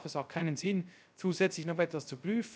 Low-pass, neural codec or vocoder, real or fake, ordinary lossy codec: none; codec, 16 kHz, 0.3 kbps, FocalCodec; fake; none